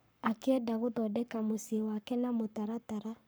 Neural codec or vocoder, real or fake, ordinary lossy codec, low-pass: codec, 44.1 kHz, 7.8 kbps, Pupu-Codec; fake; none; none